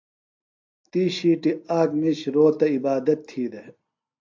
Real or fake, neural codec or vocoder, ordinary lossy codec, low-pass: real; none; AAC, 48 kbps; 7.2 kHz